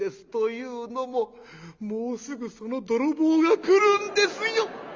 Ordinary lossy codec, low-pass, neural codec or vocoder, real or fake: Opus, 32 kbps; 7.2 kHz; none; real